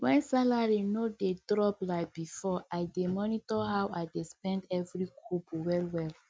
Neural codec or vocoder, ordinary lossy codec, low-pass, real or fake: none; none; none; real